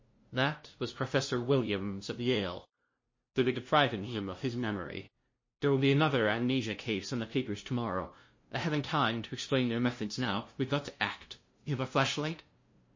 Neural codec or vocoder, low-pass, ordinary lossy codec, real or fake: codec, 16 kHz, 0.5 kbps, FunCodec, trained on LibriTTS, 25 frames a second; 7.2 kHz; MP3, 32 kbps; fake